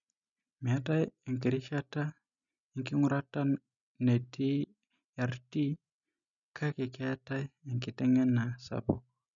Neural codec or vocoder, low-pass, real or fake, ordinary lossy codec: none; 7.2 kHz; real; none